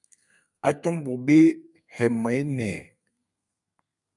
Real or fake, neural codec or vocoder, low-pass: fake; codec, 32 kHz, 1.9 kbps, SNAC; 10.8 kHz